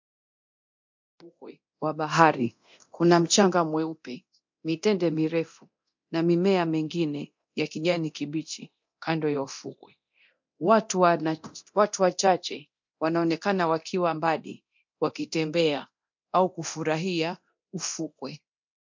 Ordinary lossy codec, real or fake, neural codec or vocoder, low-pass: MP3, 48 kbps; fake; codec, 24 kHz, 0.9 kbps, DualCodec; 7.2 kHz